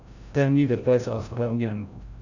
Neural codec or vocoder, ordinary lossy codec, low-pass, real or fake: codec, 16 kHz, 0.5 kbps, FreqCodec, larger model; none; 7.2 kHz; fake